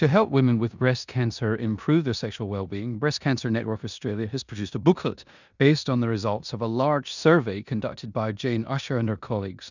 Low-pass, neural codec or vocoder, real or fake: 7.2 kHz; codec, 16 kHz in and 24 kHz out, 0.9 kbps, LongCat-Audio-Codec, four codebook decoder; fake